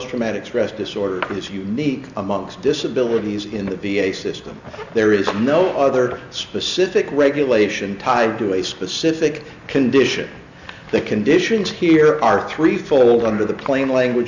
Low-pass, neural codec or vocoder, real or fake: 7.2 kHz; none; real